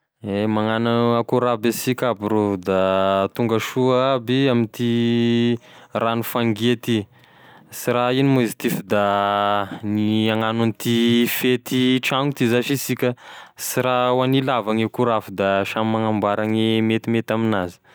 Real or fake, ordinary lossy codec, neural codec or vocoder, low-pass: real; none; none; none